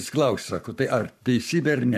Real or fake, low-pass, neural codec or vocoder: fake; 14.4 kHz; codec, 44.1 kHz, 7.8 kbps, Pupu-Codec